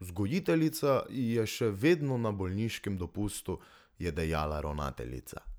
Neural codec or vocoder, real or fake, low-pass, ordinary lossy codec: none; real; none; none